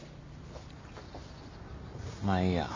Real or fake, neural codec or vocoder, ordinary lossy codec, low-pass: real; none; MP3, 48 kbps; 7.2 kHz